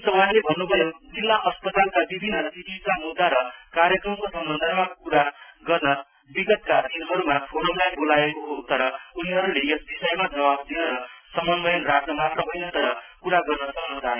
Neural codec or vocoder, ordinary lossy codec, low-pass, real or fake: none; none; 3.6 kHz; real